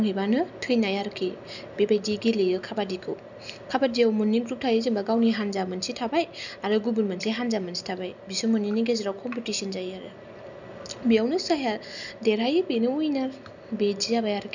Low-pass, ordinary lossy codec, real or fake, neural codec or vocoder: 7.2 kHz; none; real; none